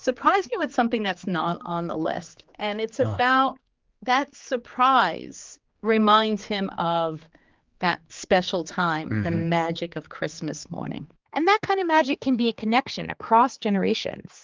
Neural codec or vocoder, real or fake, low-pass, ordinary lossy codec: codec, 16 kHz, 4 kbps, X-Codec, HuBERT features, trained on general audio; fake; 7.2 kHz; Opus, 32 kbps